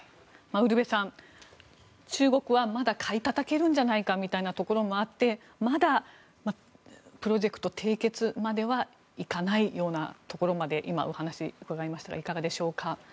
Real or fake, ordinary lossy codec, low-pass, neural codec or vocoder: real; none; none; none